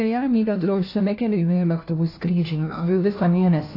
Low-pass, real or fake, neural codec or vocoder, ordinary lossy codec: 5.4 kHz; fake; codec, 16 kHz, 1 kbps, FunCodec, trained on LibriTTS, 50 frames a second; AAC, 32 kbps